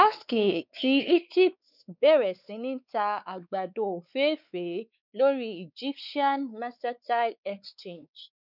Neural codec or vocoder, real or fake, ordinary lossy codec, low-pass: codec, 16 kHz, 4 kbps, X-Codec, WavLM features, trained on Multilingual LibriSpeech; fake; none; 5.4 kHz